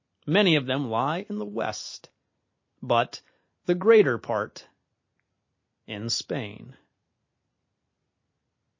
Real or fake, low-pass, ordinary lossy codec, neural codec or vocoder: real; 7.2 kHz; MP3, 32 kbps; none